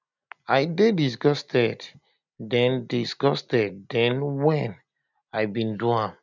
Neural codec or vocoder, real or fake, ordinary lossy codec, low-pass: none; real; none; 7.2 kHz